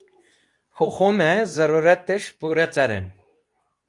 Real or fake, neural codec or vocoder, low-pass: fake; codec, 24 kHz, 0.9 kbps, WavTokenizer, medium speech release version 2; 10.8 kHz